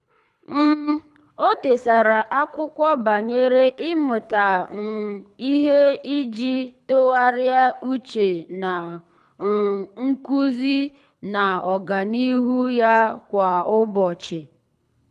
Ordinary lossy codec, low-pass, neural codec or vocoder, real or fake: none; none; codec, 24 kHz, 3 kbps, HILCodec; fake